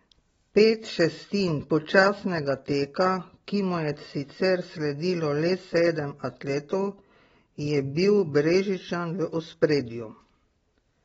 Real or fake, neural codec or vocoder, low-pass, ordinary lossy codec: real; none; 19.8 kHz; AAC, 24 kbps